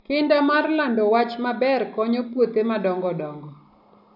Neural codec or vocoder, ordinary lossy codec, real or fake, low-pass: none; none; real; 5.4 kHz